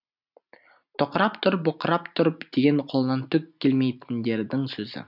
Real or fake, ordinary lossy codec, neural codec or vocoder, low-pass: real; none; none; 5.4 kHz